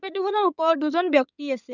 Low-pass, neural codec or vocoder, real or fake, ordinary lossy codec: 7.2 kHz; codec, 16 kHz in and 24 kHz out, 2.2 kbps, FireRedTTS-2 codec; fake; none